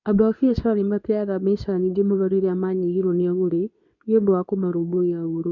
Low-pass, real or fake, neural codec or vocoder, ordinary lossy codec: 7.2 kHz; fake; codec, 24 kHz, 0.9 kbps, WavTokenizer, medium speech release version 2; none